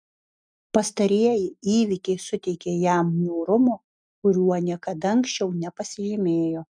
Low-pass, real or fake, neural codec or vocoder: 9.9 kHz; real; none